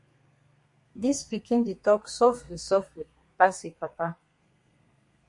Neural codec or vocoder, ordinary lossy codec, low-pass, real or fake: codec, 32 kHz, 1.9 kbps, SNAC; MP3, 48 kbps; 10.8 kHz; fake